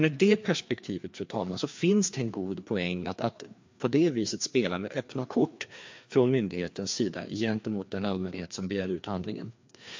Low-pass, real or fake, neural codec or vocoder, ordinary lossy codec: 7.2 kHz; fake; codec, 16 kHz, 2 kbps, FreqCodec, larger model; MP3, 48 kbps